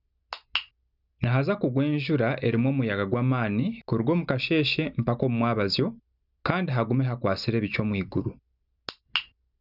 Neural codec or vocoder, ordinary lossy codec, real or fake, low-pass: none; none; real; 5.4 kHz